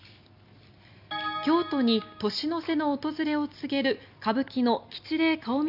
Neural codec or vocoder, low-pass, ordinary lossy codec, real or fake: none; 5.4 kHz; none; real